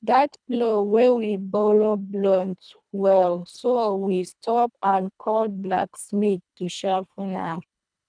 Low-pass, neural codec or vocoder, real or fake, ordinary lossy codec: 9.9 kHz; codec, 24 kHz, 1.5 kbps, HILCodec; fake; none